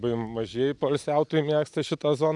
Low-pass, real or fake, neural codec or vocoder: 10.8 kHz; real; none